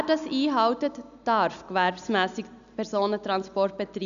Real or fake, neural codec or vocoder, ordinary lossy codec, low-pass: real; none; none; 7.2 kHz